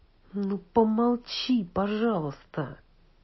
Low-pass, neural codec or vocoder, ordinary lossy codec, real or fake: 7.2 kHz; none; MP3, 24 kbps; real